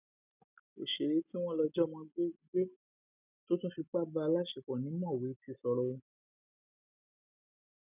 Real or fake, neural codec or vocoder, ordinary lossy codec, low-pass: real; none; none; 3.6 kHz